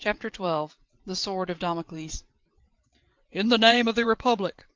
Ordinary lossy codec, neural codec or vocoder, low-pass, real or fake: Opus, 32 kbps; vocoder, 22.05 kHz, 80 mel bands, WaveNeXt; 7.2 kHz; fake